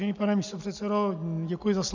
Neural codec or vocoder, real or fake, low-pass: none; real; 7.2 kHz